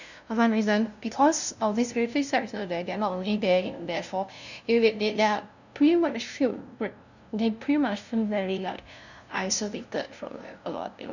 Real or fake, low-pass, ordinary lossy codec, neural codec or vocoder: fake; 7.2 kHz; none; codec, 16 kHz, 0.5 kbps, FunCodec, trained on LibriTTS, 25 frames a second